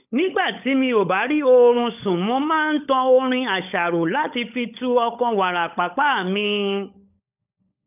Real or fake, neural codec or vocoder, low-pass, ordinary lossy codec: fake; codec, 16 kHz, 16 kbps, FunCodec, trained on LibriTTS, 50 frames a second; 3.6 kHz; none